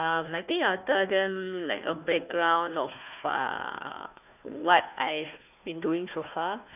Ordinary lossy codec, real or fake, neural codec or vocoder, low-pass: none; fake; codec, 16 kHz, 1 kbps, FunCodec, trained on Chinese and English, 50 frames a second; 3.6 kHz